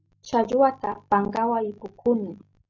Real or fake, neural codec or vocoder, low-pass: real; none; 7.2 kHz